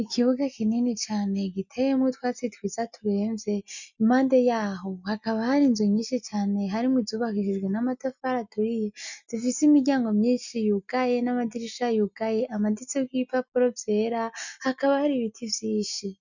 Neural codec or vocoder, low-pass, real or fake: none; 7.2 kHz; real